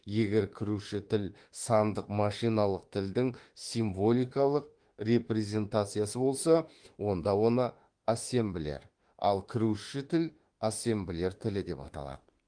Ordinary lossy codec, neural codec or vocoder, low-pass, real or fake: Opus, 24 kbps; autoencoder, 48 kHz, 32 numbers a frame, DAC-VAE, trained on Japanese speech; 9.9 kHz; fake